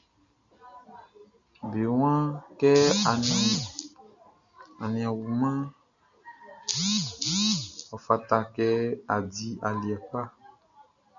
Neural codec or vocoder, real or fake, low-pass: none; real; 7.2 kHz